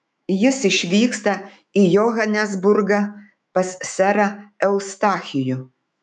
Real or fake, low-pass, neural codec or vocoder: fake; 10.8 kHz; autoencoder, 48 kHz, 128 numbers a frame, DAC-VAE, trained on Japanese speech